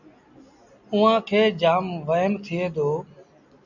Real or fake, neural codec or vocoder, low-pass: real; none; 7.2 kHz